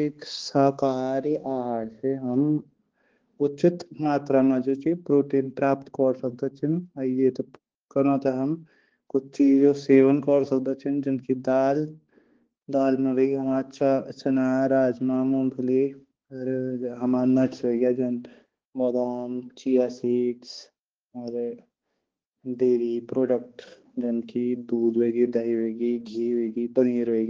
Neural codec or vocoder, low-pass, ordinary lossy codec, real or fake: codec, 16 kHz, 2 kbps, X-Codec, HuBERT features, trained on balanced general audio; 7.2 kHz; Opus, 16 kbps; fake